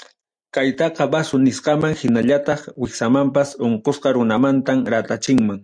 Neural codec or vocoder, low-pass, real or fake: none; 9.9 kHz; real